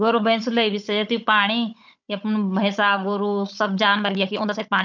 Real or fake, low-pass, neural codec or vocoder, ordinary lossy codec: fake; 7.2 kHz; codec, 16 kHz, 16 kbps, FunCodec, trained on Chinese and English, 50 frames a second; none